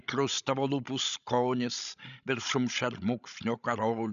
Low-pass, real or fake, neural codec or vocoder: 7.2 kHz; fake; codec, 16 kHz, 16 kbps, FreqCodec, larger model